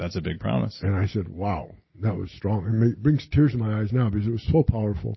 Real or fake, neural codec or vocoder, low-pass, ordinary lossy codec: real; none; 7.2 kHz; MP3, 24 kbps